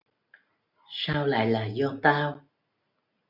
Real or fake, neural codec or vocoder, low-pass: real; none; 5.4 kHz